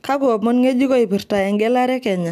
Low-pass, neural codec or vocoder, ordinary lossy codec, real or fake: 14.4 kHz; none; none; real